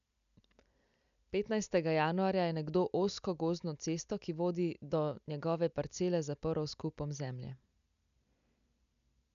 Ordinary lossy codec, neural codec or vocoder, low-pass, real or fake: none; none; 7.2 kHz; real